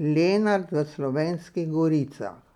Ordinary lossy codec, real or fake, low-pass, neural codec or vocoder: none; real; 19.8 kHz; none